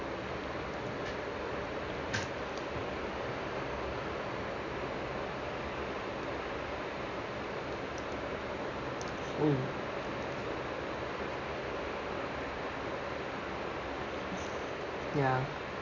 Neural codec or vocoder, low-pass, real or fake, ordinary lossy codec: none; 7.2 kHz; real; none